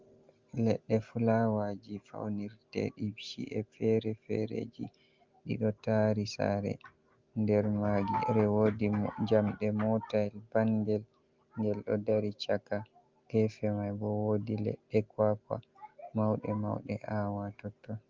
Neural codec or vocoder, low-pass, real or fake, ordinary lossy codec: none; 7.2 kHz; real; Opus, 32 kbps